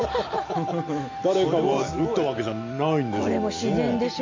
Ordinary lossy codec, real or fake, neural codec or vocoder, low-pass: MP3, 64 kbps; real; none; 7.2 kHz